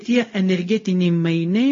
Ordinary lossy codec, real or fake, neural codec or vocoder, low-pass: MP3, 32 kbps; fake; codec, 16 kHz, 0.4 kbps, LongCat-Audio-Codec; 7.2 kHz